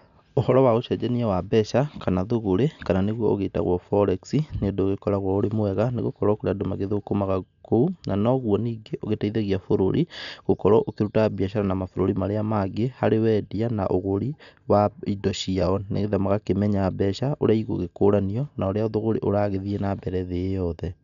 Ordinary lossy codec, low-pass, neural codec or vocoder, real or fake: none; 7.2 kHz; none; real